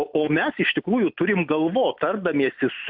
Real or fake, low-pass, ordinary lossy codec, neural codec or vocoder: real; 5.4 kHz; AAC, 48 kbps; none